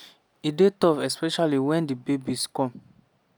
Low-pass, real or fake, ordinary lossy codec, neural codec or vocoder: none; real; none; none